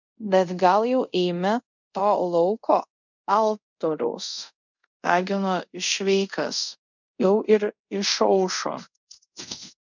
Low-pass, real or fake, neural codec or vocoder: 7.2 kHz; fake; codec, 24 kHz, 0.5 kbps, DualCodec